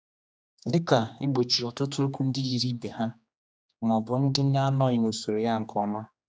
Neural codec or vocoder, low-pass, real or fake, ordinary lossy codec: codec, 16 kHz, 2 kbps, X-Codec, HuBERT features, trained on general audio; none; fake; none